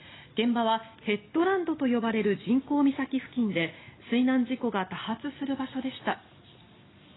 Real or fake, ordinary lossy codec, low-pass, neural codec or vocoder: real; AAC, 16 kbps; 7.2 kHz; none